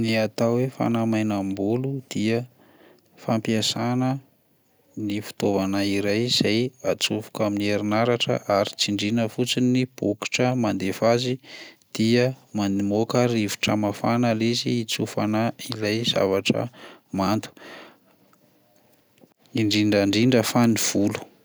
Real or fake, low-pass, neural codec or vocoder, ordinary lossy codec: real; none; none; none